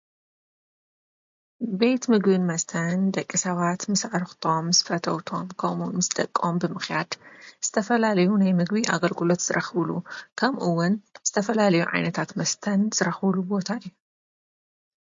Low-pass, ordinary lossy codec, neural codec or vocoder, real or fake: 7.2 kHz; MP3, 48 kbps; none; real